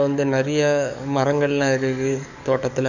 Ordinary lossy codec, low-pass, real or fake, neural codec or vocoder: none; 7.2 kHz; fake; codec, 44.1 kHz, 7.8 kbps, DAC